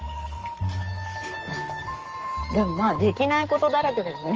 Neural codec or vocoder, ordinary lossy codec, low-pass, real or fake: codec, 44.1 kHz, 7.8 kbps, Pupu-Codec; Opus, 24 kbps; 7.2 kHz; fake